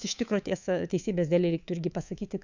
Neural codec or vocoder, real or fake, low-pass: codec, 24 kHz, 3.1 kbps, DualCodec; fake; 7.2 kHz